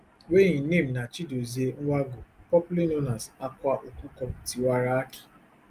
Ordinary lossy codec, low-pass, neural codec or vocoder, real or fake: Opus, 32 kbps; 14.4 kHz; none; real